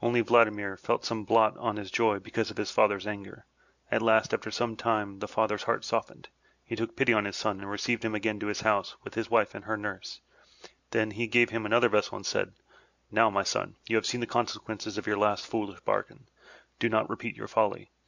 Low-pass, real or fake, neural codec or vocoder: 7.2 kHz; real; none